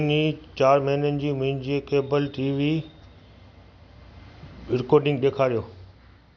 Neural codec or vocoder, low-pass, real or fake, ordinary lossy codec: none; 7.2 kHz; real; none